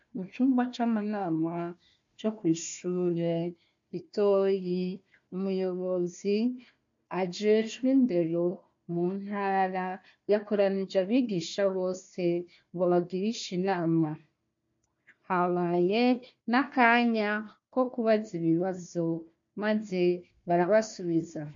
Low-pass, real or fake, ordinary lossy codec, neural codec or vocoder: 7.2 kHz; fake; MP3, 48 kbps; codec, 16 kHz, 1 kbps, FunCodec, trained on Chinese and English, 50 frames a second